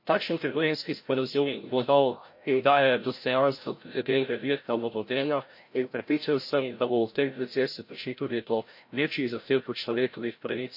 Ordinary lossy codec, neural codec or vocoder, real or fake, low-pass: MP3, 32 kbps; codec, 16 kHz, 0.5 kbps, FreqCodec, larger model; fake; 5.4 kHz